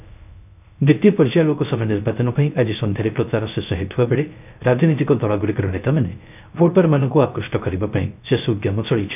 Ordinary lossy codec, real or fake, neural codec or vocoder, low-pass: none; fake; codec, 16 kHz, 0.3 kbps, FocalCodec; 3.6 kHz